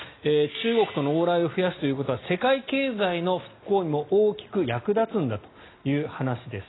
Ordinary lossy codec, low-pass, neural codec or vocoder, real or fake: AAC, 16 kbps; 7.2 kHz; none; real